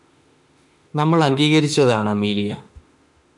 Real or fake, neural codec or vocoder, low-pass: fake; autoencoder, 48 kHz, 32 numbers a frame, DAC-VAE, trained on Japanese speech; 10.8 kHz